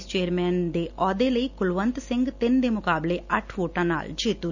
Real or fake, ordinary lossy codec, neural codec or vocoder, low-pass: real; none; none; 7.2 kHz